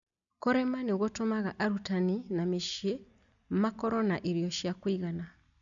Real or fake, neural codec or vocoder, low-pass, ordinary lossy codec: real; none; 7.2 kHz; MP3, 96 kbps